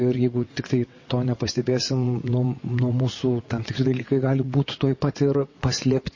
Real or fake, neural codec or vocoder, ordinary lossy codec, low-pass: fake; vocoder, 22.05 kHz, 80 mel bands, WaveNeXt; MP3, 32 kbps; 7.2 kHz